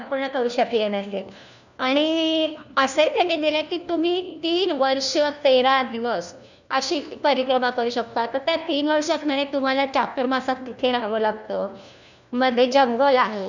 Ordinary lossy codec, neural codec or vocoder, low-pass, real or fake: none; codec, 16 kHz, 1 kbps, FunCodec, trained on LibriTTS, 50 frames a second; 7.2 kHz; fake